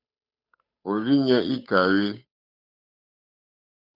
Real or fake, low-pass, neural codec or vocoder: fake; 5.4 kHz; codec, 16 kHz, 8 kbps, FunCodec, trained on Chinese and English, 25 frames a second